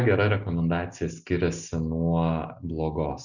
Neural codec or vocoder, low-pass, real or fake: none; 7.2 kHz; real